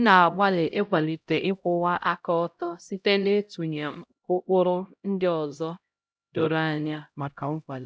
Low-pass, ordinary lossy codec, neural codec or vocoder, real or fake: none; none; codec, 16 kHz, 0.5 kbps, X-Codec, HuBERT features, trained on LibriSpeech; fake